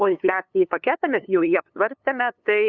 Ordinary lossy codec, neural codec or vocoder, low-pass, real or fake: Opus, 64 kbps; codec, 16 kHz, 2 kbps, FunCodec, trained on LibriTTS, 25 frames a second; 7.2 kHz; fake